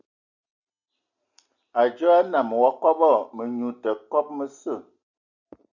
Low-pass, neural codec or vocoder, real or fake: 7.2 kHz; none; real